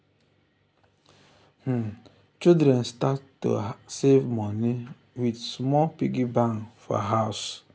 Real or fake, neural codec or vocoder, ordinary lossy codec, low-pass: real; none; none; none